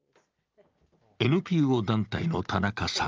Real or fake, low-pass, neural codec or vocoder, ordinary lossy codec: real; 7.2 kHz; none; Opus, 24 kbps